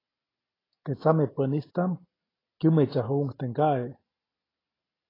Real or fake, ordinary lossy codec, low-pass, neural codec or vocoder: real; AAC, 24 kbps; 5.4 kHz; none